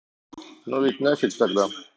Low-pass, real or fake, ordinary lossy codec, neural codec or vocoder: none; real; none; none